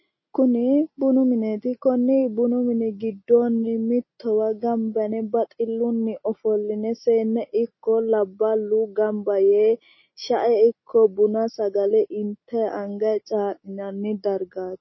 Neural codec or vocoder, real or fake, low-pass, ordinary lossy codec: none; real; 7.2 kHz; MP3, 24 kbps